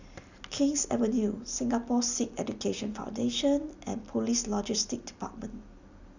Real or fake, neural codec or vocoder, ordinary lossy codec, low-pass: real; none; none; 7.2 kHz